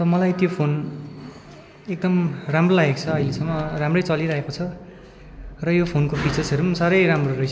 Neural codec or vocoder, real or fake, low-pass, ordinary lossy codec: none; real; none; none